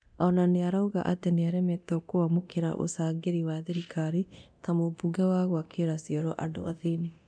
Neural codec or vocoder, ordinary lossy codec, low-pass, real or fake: codec, 24 kHz, 0.9 kbps, DualCodec; none; 9.9 kHz; fake